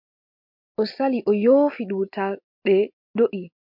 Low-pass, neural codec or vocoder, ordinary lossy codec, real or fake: 5.4 kHz; none; MP3, 48 kbps; real